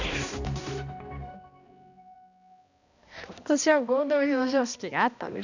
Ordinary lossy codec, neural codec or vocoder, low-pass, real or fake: none; codec, 16 kHz, 1 kbps, X-Codec, HuBERT features, trained on balanced general audio; 7.2 kHz; fake